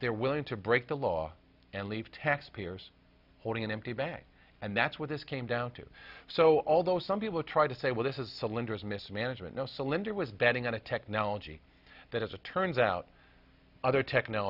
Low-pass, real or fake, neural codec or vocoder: 5.4 kHz; real; none